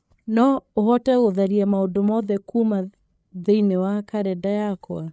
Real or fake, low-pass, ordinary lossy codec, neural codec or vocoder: fake; none; none; codec, 16 kHz, 8 kbps, FreqCodec, larger model